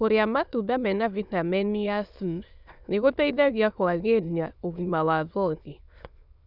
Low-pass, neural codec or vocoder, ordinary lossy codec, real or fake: 5.4 kHz; autoencoder, 22.05 kHz, a latent of 192 numbers a frame, VITS, trained on many speakers; none; fake